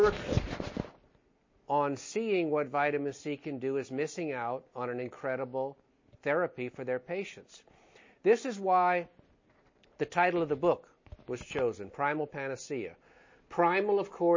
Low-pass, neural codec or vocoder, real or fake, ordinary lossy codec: 7.2 kHz; none; real; MP3, 32 kbps